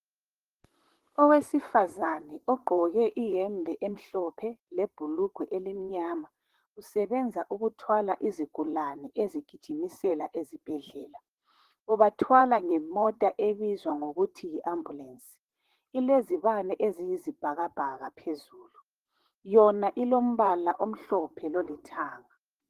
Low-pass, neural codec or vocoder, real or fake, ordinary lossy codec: 14.4 kHz; vocoder, 44.1 kHz, 128 mel bands, Pupu-Vocoder; fake; Opus, 16 kbps